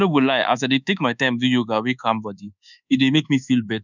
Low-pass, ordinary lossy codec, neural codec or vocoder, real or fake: 7.2 kHz; none; codec, 24 kHz, 1.2 kbps, DualCodec; fake